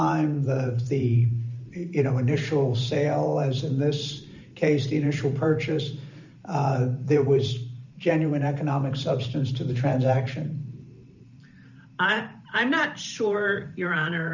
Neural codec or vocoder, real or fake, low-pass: vocoder, 44.1 kHz, 128 mel bands every 512 samples, BigVGAN v2; fake; 7.2 kHz